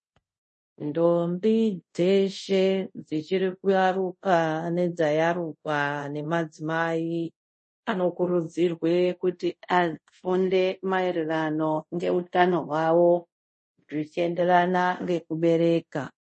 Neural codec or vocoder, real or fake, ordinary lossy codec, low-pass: codec, 24 kHz, 0.5 kbps, DualCodec; fake; MP3, 32 kbps; 10.8 kHz